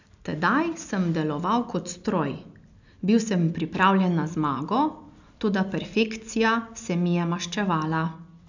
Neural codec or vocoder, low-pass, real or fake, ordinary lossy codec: none; 7.2 kHz; real; none